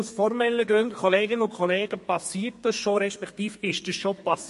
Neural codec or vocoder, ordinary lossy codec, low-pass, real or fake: codec, 32 kHz, 1.9 kbps, SNAC; MP3, 48 kbps; 14.4 kHz; fake